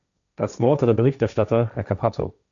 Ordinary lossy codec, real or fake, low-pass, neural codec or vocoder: MP3, 96 kbps; fake; 7.2 kHz; codec, 16 kHz, 1.1 kbps, Voila-Tokenizer